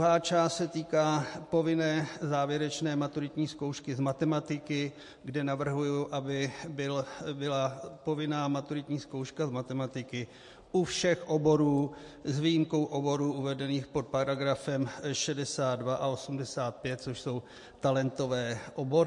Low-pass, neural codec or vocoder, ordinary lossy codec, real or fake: 10.8 kHz; none; MP3, 48 kbps; real